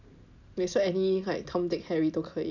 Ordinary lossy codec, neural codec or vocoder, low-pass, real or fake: none; none; 7.2 kHz; real